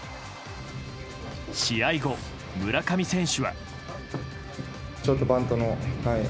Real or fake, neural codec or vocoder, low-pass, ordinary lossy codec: real; none; none; none